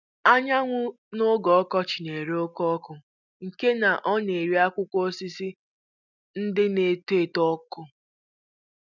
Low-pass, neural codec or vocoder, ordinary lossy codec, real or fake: 7.2 kHz; none; none; real